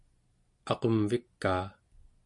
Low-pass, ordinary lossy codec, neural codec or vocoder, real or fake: 10.8 kHz; MP3, 48 kbps; none; real